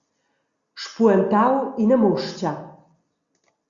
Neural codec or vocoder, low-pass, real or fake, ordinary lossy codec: none; 7.2 kHz; real; Opus, 64 kbps